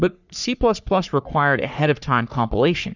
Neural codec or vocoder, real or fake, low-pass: codec, 44.1 kHz, 3.4 kbps, Pupu-Codec; fake; 7.2 kHz